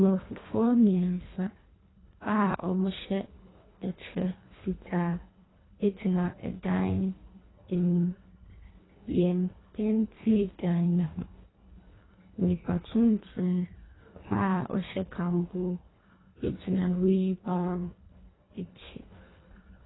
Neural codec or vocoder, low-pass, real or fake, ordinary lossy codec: codec, 24 kHz, 1.5 kbps, HILCodec; 7.2 kHz; fake; AAC, 16 kbps